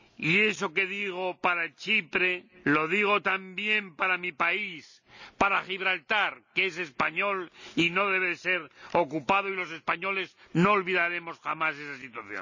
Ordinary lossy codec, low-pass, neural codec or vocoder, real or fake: none; 7.2 kHz; none; real